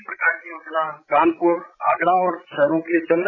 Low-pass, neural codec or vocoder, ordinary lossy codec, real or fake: 7.2 kHz; codec, 16 kHz, 16 kbps, FreqCodec, larger model; none; fake